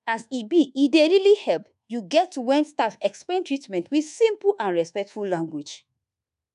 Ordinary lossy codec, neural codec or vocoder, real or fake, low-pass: none; codec, 24 kHz, 1.2 kbps, DualCodec; fake; 10.8 kHz